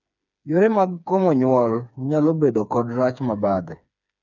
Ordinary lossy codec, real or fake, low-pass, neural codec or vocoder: none; fake; 7.2 kHz; codec, 16 kHz, 4 kbps, FreqCodec, smaller model